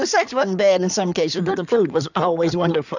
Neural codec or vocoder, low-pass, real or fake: codec, 16 kHz in and 24 kHz out, 2.2 kbps, FireRedTTS-2 codec; 7.2 kHz; fake